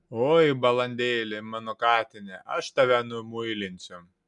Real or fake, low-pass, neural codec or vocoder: real; 10.8 kHz; none